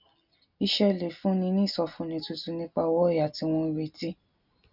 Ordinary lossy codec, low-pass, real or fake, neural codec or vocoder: none; 5.4 kHz; real; none